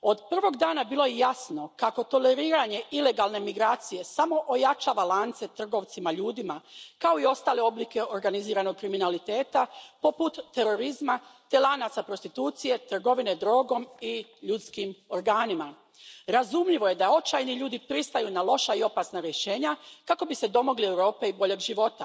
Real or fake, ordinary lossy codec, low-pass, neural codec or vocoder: real; none; none; none